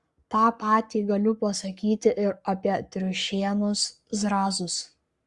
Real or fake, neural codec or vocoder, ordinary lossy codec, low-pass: fake; codec, 44.1 kHz, 7.8 kbps, Pupu-Codec; Opus, 64 kbps; 10.8 kHz